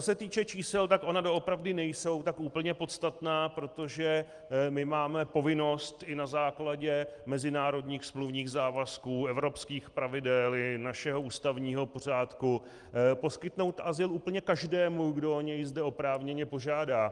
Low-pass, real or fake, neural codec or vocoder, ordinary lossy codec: 10.8 kHz; real; none; Opus, 24 kbps